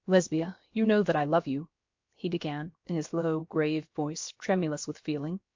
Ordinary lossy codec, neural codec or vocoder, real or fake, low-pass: MP3, 48 kbps; codec, 16 kHz, about 1 kbps, DyCAST, with the encoder's durations; fake; 7.2 kHz